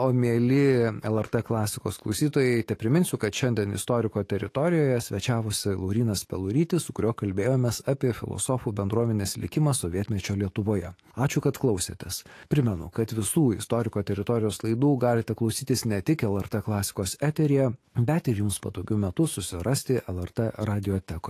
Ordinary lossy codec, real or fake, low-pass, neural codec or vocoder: AAC, 48 kbps; fake; 14.4 kHz; autoencoder, 48 kHz, 128 numbers a frame, DAC-VAE, trained on Japanese speech